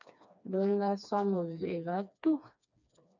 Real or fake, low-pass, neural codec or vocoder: fake; 7.2 kHz; codec, 16 kHz, 2 kbps, FreqCodec, smaller model